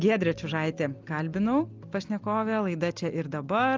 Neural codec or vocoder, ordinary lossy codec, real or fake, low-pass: none; Opus, 32 kbps; real; 7.2 kHz